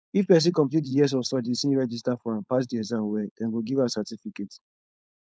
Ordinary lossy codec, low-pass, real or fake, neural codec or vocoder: none; none; fake; codec, 16 kHz, 4.8 kbps, FACodec